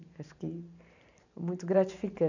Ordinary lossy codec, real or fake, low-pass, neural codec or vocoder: none; fake; 7.2 kHz; vocoder, 44.1 kHz, 128 mel bands every 512 samples, BigVGAN v2